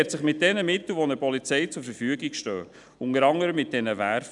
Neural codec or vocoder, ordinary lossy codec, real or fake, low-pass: none; none; real; 10.8 kHz